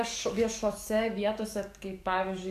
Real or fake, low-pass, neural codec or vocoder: fake; 14.4 kHz; codec, 44.1 kHz, 7.8 kbps, DAC